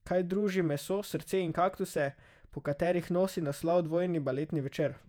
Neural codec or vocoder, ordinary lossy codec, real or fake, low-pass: vocoder, 48 kHz, 128 mel bands, Vocos; none; fake; 19.8 kHz